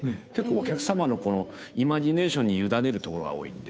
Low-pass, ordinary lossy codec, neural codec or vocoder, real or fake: none; none; codec, 16 kHz, 2 kbps, FunCodec, trained on Chinese and English, 25 frames a second; fake